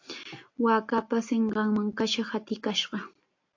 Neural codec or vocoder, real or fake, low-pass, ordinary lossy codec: none; real; 7.2 kHz; AAC, 48 kbps